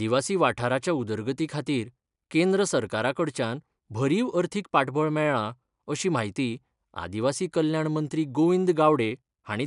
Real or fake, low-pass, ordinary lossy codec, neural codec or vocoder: real; 10.8 kHz; none; none